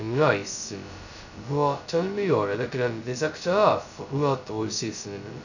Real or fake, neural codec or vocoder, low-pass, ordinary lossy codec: fake; codec, 16 kHz, 0.2 kbps, FocalCodec; 7.2 kHz; none